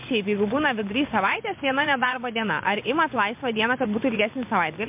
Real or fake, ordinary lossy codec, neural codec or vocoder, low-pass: fake; MP3, 32 kbps; vocoder, 24 kHz, 100 mel bands, Vocos; 3.6 kHz